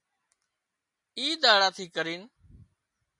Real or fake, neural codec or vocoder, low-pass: real; none; 10.8 kHz